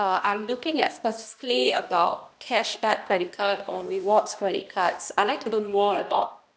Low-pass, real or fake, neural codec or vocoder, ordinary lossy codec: none; fake; codec, 16 kHz, 1 kbps, X-Codec, HuBERT features, trained on balanced general audio; none